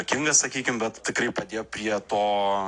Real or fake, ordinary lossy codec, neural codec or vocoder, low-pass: real; AAC, 48 kbps; none; 9.9 kHz